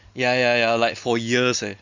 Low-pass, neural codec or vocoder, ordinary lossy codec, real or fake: 7.2 kHz; none; Opus, 64 kbps; real